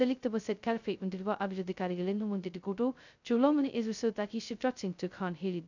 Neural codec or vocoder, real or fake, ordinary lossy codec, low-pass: codec, 16 kHz, 0.2 kbps, FocalCodec; fake; none; 7.2 kHz